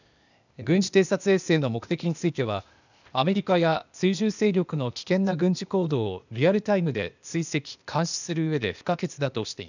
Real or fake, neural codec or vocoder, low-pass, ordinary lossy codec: fake; codec, 16 kHz, 0.8 kbps, ZipCodec; 7.2 kHz; none